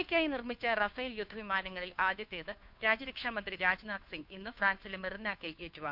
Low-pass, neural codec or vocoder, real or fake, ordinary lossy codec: 5.4 kHz; codec, 16 kHz, 2 kbps, FunCodec, trained on Chinese and English, 25 frames a second; fake; none